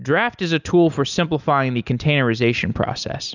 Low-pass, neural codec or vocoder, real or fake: 7.2 kHz; none; real